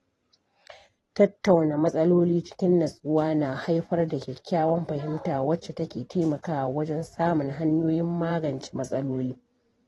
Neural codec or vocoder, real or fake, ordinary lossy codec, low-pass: vocoder, 44.1 kHz, 128 mel bands every 512 samples, BigVGAN v2; fake; AAC, 32 kbps; 19.8 kHz